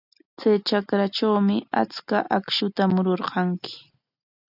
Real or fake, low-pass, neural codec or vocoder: real; 5.4 kHz; none